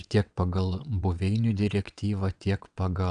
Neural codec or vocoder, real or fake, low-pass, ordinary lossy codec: vocoder, 22.05 kHz, 80 mel bands, WaveNeXt; fake; 9.9 kHz; MP3, 96 kbps